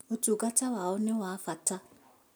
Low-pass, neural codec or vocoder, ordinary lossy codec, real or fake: none; none; none; real